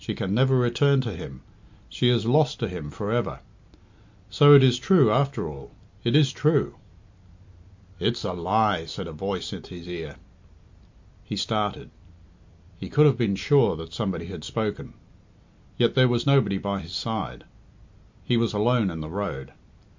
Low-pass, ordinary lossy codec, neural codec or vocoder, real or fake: 7.2 kHz; MP3, 48 kbps; none; real